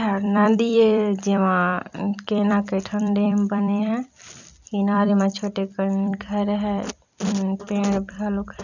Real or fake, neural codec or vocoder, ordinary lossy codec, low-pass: fake; vocoder, 44.1 kHz, 128 mel bands every 256 samples, BigVGAN v2; none; 7.2 kHz